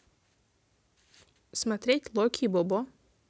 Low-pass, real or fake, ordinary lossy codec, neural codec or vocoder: none; real; none; none